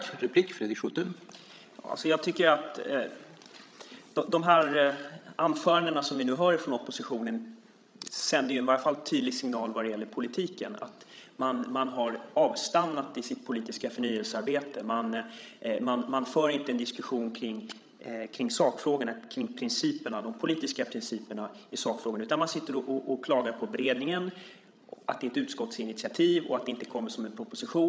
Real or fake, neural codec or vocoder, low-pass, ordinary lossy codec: fake; codec, 16 kHz, 16 kbps, FreqCodec, larger model; none; none